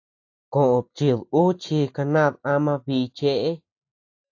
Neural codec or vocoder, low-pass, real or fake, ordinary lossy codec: none; 7.2 kHz; real; AAC, 48 kbps